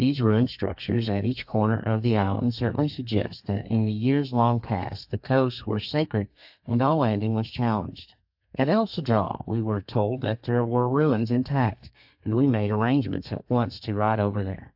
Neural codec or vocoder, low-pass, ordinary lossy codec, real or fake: codec, 32 kHz, 1.9 kbps, SNAC; 5.4 kHz; AAC, 48 kbps; fake